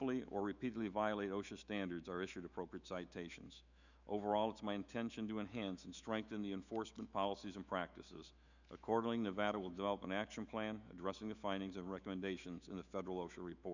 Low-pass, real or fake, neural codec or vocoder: 7.2 kHz; fake; autoencoder, 48 kHz, 128 numbers a frame, DAC-VAE, trained on Japanese speech